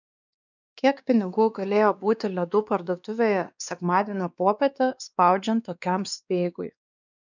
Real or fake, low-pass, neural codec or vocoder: fake; 7.2 kHz; codec, 16 kHz, 2 kbps, X-Codec, WavLM features, trained on Multilingual LibriSpeech